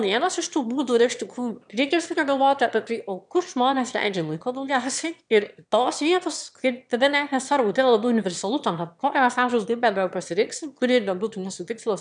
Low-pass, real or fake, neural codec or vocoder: 9.9 kHz; fake; autoencoder, 22.05 kHz, a latent of 192 numbers a frame, VITS, trained on one speaker